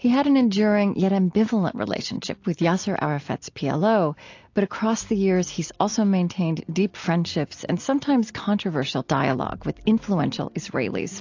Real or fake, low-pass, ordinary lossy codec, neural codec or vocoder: real; 7.2 kHz; AAC, 48 kbps; none